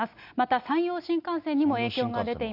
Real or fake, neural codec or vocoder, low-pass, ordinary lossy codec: real; none; 5.4 kHz; none